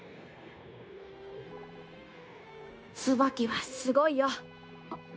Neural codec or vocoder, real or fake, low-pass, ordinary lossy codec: codec, 16 kHz, 0.9 kbps, LongCat-Audio-Codec; fake; none; none